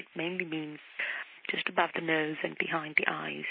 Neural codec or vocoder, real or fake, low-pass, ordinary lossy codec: none; real; 5.4 kHz; MP3, 24 kbps